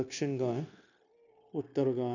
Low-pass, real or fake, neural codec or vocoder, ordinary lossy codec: 7.2 kHz; fake; codec, 16 kHz, 0.9 kbps, LongCat-Audio-Codec; none